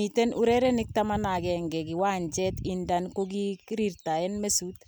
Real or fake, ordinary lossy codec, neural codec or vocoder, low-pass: real; none; none; none